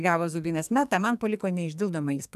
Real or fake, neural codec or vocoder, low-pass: fake; codec, 44.1 kHz, 2.6 kbps, SNAC; 14.4 kHz